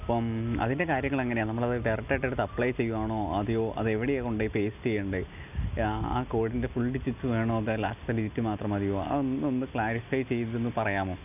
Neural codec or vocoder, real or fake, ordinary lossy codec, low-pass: none; real; none; 3.6 kHz